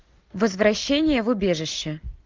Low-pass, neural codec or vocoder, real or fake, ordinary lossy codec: 7.2 kHz; none; real; Opus, 32 kbps